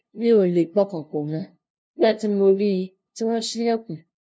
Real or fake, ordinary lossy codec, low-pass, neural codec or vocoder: fake; none; none; codec, 16 kHz, 0.5 kbps, FunCodec, trained on LibriTTS, 25 frames a second